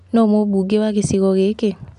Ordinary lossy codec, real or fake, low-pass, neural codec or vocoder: none; real; 10.8 kHz; none